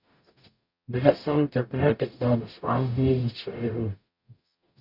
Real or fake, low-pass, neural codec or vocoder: fake; 5.4 kHz; codec, 44.1 kHz, 0.9 kbps, DAC